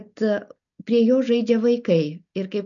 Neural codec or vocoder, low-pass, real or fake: none; 7.2 kHz; real